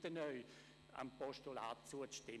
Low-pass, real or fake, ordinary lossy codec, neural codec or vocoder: none; real; none; none